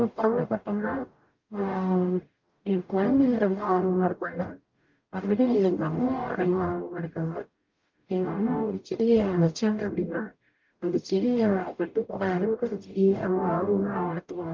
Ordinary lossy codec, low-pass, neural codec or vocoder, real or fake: Opus, 24 kbps; 7.2 kHz; codec, 44.1 kHz, 0.9 kbps, DAC; fake